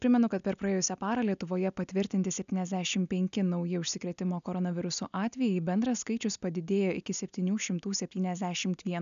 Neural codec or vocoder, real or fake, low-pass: none; real; 7.2 kHz